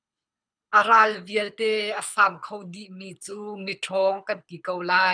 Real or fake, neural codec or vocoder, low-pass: fake; codec, 24 kHz, 6 kbps, HILCodec; 9.9 kHz